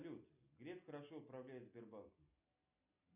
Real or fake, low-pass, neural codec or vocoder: real; 3.6 kHz; none